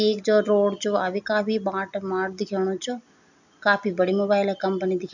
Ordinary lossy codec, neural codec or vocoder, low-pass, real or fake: none; none; 7.2 kHz; real